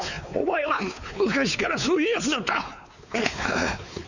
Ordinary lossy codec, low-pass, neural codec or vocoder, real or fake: none; 7.2 kHz; codec, 16 kHz, 4 kbps, X-Codec, WavLM features, trained on Multilingual LibriSpeech; fake